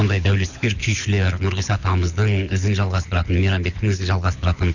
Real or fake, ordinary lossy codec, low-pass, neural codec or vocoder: fake; none; 7.2 kHz; codec, 24 kHz, 6 kbps, HILCodec